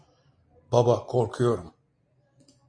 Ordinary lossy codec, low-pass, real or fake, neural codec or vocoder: MP3, 48 kbps; 9.9 kHz; real; none